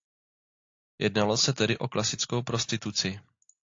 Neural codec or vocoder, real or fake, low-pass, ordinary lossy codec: none; real; 7.2 kHz; AAC, 48 kbps